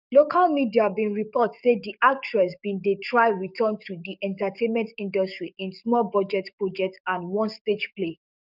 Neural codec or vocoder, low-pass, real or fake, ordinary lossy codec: codec, 44.1 kHz, 7.8 kbps, DAC; 5.4 kHz; fake; none